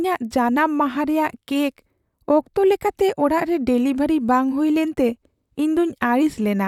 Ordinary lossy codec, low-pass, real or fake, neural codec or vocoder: none; 19.8 kHz; fake; vocoder, 44.1 kHz, 128 mel bands, Pupu-Vocoder